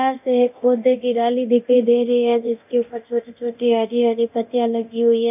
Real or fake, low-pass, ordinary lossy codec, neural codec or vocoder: fake; 3.6 kHz; none; codec, 24 kHz, 0.9 kbps, DualCodec